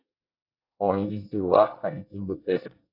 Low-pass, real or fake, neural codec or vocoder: 5.4 kHz; fake; codec, 24 kHz, 1 kbps, SNAC